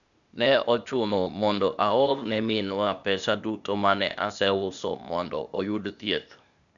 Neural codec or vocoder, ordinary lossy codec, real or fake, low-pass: codec, 16 kHz, 0.8 kbps, ZipCodec; none; fake; 7.2 kHz